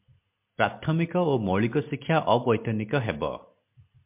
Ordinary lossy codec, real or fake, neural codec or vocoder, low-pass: MP3, 32 kbps; real; none; 3.6 kHz